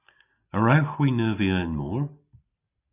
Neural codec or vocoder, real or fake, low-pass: none; real; 3.6 kHz